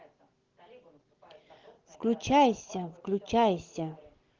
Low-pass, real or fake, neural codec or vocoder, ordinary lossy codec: 7.2 kHz; real; none; Opus, 16 kbps